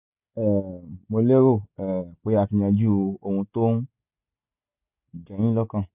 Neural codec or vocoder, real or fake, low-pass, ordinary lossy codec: none; real; 3.6 kHz; none